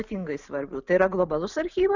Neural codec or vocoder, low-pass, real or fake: none; 7.2 kHz; real